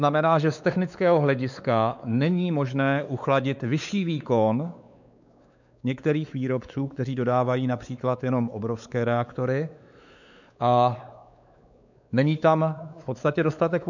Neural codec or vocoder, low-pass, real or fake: codec, 16 kHz, 4 kbps, X-Codec, WavLM features, trained on Multilingual LibriSpeech; 7.2 kHz; fake